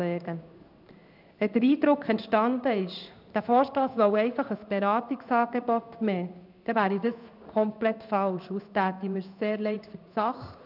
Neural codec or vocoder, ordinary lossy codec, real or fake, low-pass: codec, 16 kHz in and 24 kHz out, 1 kbps, XY-Tokenizer; none; fake; 5.4 kHz